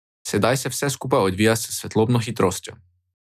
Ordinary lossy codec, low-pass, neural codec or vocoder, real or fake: none; 14.4 kHz; none; real